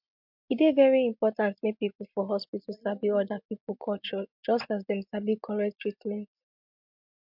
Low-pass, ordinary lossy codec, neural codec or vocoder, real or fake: 5.4 kHz; MP3, 48 kbps; none; real